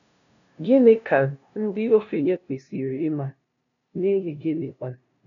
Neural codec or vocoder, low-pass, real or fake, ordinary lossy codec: codec, 16 kHz, 0.5 kbps, FunCodec, trained on LibriTTS, 25 frames a second; 7.2 kHz; fake; none